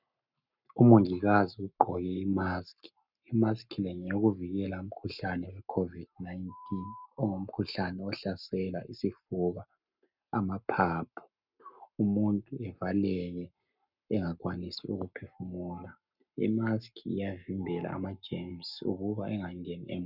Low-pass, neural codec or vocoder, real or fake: 5.4 kHz; codec, 44.1 kHz, 7.8 kbps, Pupu-Codec; fake